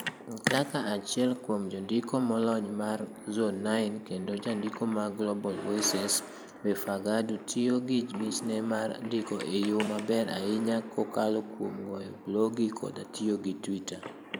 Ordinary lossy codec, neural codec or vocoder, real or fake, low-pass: none; vocoder, 44.1 kHz, 128 mel bands every 512 samples, BigVGAN v2; fake; none